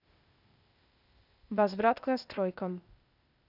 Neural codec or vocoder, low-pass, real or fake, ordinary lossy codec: codec, 16 kHz, 0.8 kbps, ZipCodec; 5.4 kHz; fake; none